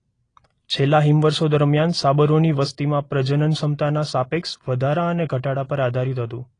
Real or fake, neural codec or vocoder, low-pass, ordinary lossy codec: real; none; 9.9 kHz; AAC, 32 kbps